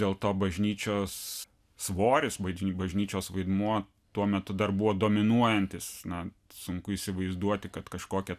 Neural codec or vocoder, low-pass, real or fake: none; 14.4 kHz; real